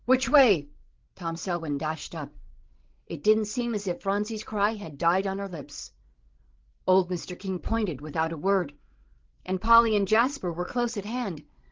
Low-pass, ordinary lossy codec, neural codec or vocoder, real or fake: 7.2 kHz; Opus, 16 kbps; codec, 16 kHz, 16 kbps, FreqCodec, larger model; fake